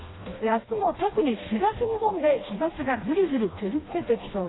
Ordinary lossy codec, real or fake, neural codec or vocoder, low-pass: AAC, 16 kbps; fake; codec, 16 kHz, 1 kbps, FreqCodec, smaller model; 7.2 kHz